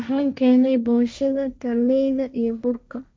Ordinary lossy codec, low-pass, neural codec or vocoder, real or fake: none; none; codec, 16 kHz, 1.1 kbps, Voila-Tokenizer; fake